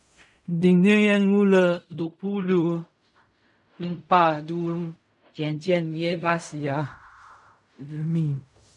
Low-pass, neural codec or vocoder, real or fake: 10.8 kHz; codec, 16 kHz in and 24 kHz out, 0.4 kbps, LongCat-Audio-Codec, fine tuned four codebook decoder; fake